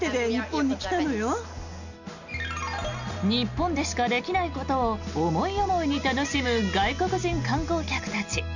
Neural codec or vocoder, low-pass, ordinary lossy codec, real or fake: none; 7.2 kHz; none; real